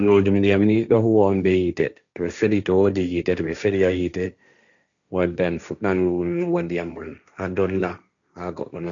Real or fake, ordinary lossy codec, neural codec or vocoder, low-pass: fake; none; codec, 16 kHz, 1.1 kbps, Voila-Tokenizer; 7.2 kHz